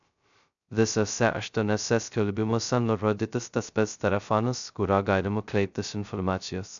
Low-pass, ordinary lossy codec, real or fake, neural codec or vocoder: 7.2 kHz; MP3, 96 kbps; fake; codec, 16 kHz, 0.2 kbps, FocalCodec